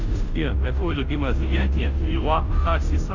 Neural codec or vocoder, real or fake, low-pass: codec, 16 kHz, 0.5 kbps, FunCodec, trained on Chinese and English, 25 frames a second; fake; 7.2 kHz